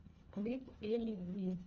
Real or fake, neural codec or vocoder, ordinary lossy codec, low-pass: fake; codec, 24 kHz, 1.5 kbps, HILCodec; Opus, 32 kbps; 7.2 kHz